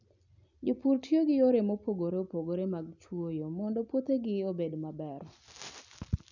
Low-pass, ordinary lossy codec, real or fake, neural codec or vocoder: 7.2 kHz; none; real; none